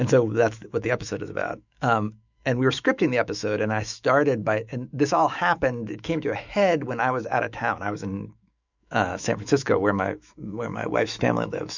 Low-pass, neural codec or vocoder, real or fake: 7.2 kHz; autoencoder, 48 kHz, 128 numbers a frame, DAC-VAE, trained on Japanese speech; fake